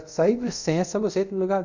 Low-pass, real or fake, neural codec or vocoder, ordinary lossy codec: 7.2 kHz; fake; codec, 24 kHz, 0.5 kbps, DualCodec; none